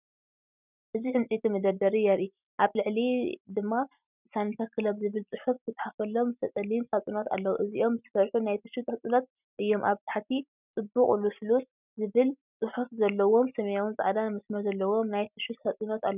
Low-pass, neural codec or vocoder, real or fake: 3.6 kHz; none; real